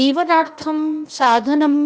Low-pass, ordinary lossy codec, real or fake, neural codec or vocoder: none; none; fake; codec, 16 kHz, 0.8 kbps, ZipCodec